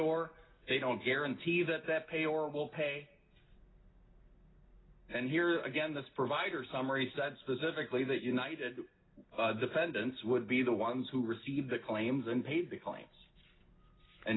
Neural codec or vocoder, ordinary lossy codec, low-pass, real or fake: none; AAC, 16 kbps; 7.2 kHz; real